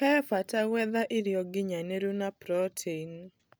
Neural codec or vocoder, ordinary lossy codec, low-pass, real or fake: none; none; none; real